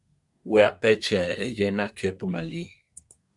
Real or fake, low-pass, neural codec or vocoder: fake; 10.8 kHz; codec, 24 kHz, 1 kbps, SNAC